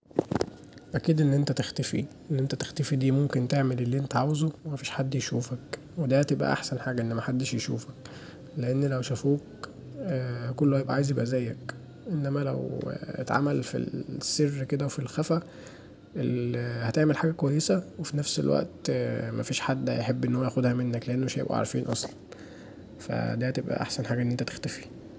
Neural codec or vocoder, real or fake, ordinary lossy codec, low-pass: none; real; none; none